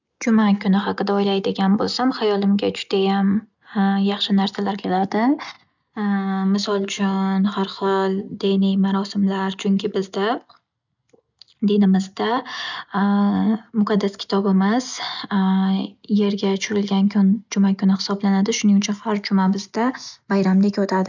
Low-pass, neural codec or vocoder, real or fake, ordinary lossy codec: 7.2 kHz; none; real; none